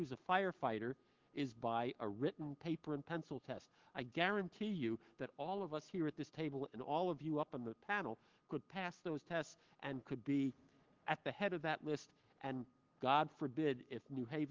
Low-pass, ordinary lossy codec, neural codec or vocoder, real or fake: 7.2 kHz; Opus, 16 kbps; autoencoder, 48 kHz, 128 numbers a frame, DAC-VAE, trained on Japanese speech; fake